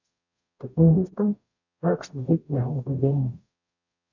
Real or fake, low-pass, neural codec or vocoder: fake; 7.2 kHz; codec, 44.1 kHz, 0.9 kbps, DAC